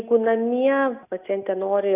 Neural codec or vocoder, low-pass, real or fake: none; 3.6 kHz; real